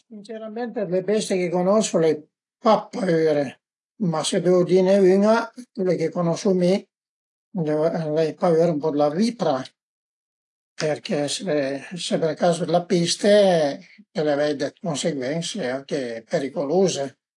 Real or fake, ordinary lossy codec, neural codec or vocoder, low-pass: real; AAC, 48 kbps; none; 10.8 kHz